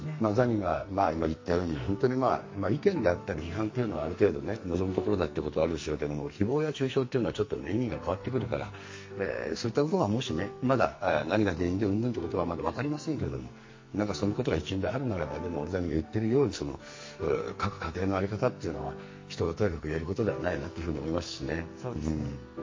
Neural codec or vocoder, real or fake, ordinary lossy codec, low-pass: codec, 44.1 kHz, 2.6 kbps, SNAC; fake; MP3, 32 kbps; 7.2 kHz